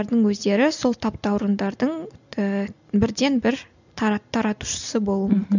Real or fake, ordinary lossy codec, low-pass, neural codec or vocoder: real; none; 7.2 kHz; none